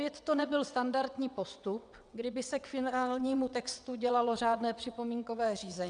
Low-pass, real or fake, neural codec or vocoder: 9.9 kHz; fake; vocoder, 22.05 kHz, 80 mel bands, WaveNeXt